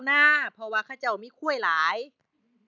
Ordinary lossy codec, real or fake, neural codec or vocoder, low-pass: none; real; none; 7.2 kHz